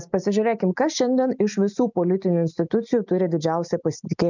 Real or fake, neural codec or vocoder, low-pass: real; none; 7.2 kHz